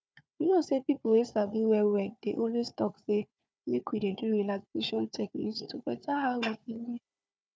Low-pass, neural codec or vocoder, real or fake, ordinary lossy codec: none; codec, 16 kHz, 4 kbps, FunCodec, trained on Chinese and English, 50 frames a second; fake; none